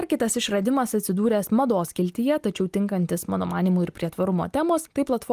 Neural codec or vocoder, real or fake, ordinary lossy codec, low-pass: none; real; Opus, 32 kbps; 14.4 kHz